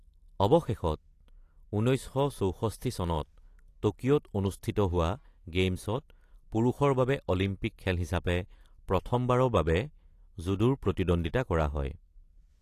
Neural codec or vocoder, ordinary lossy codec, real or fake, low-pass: none; AAC, 48 kbps; real; 14.4 kHz